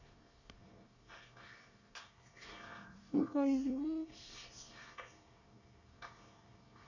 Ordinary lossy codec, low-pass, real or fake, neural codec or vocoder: none; 7.2 kHz; fake; codec, 24 kHz, 1 kbps, SNAC